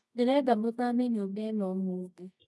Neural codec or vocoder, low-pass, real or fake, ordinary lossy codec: codec, 24 kHz, 0.9 kbps, WavTokenizer, medium music audio release; none; fake; none